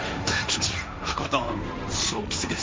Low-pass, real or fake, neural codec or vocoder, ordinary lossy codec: none; fake; codec, 16 kHz, 1.1 kbps, Voila-Tokenizer; none